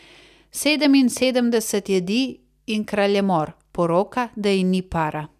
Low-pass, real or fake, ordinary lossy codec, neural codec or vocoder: 14.4 kHz; real; none; none